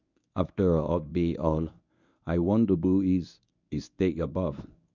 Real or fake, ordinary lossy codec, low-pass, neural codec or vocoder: fake; none; 7.2 kHz; codec, 24 kHz, 0.9 kbps, WavTokenizer, medium speech release version 1